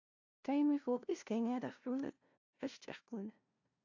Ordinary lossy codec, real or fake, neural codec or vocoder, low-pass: AAC, 48 kbps; fake; codec, 16 kHz, 0.5 kbps, FunCodec, trained on LibriTTS, 25 frames a second; 7.2 kHz